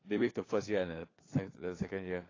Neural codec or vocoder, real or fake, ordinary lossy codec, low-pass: codec, 16 kHz, 4 kbps, FunCodec, trained on LibriTTS, 50 frames a second; fake; AAC, 32 kbps; 7.2 kHz